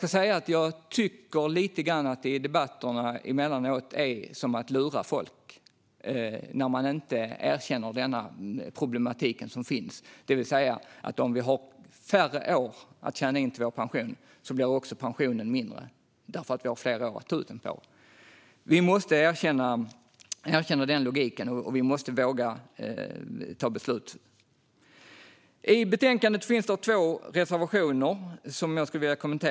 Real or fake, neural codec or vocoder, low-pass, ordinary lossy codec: real; none; none; none